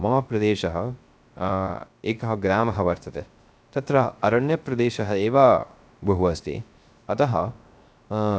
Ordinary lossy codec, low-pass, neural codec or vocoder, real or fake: none; none; codec, 16 kHz, 0.3 kbps, FocalCodec; fake